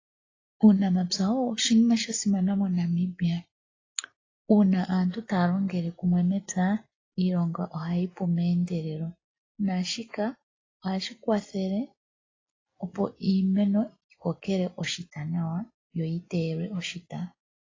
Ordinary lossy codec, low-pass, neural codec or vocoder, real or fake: AAC, 32 kbps; 7.2 kHz; none; real